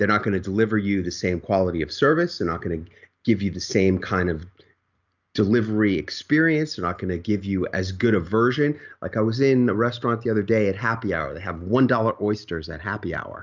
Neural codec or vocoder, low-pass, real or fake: none; 7.2 kHz; real